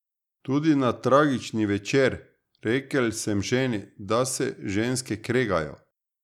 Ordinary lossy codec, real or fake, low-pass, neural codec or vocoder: none; real; 19.8 kHz; none